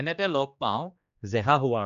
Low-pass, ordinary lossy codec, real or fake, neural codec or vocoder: 7.2 kHz; none; fake; codec, 16 kHz, 2 kbps, X-Codec, HuBERT features, trained on general audio